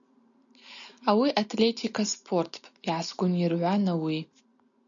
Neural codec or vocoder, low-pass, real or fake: none; 7.2 kHz; real